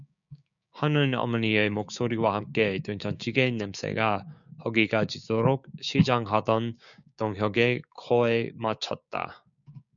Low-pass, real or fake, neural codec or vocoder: 7.2 kHz; fake; codec, 16 kHz, 6 kbps, DAC